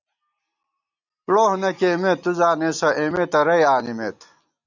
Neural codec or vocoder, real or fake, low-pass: none; real; 7.2 kHz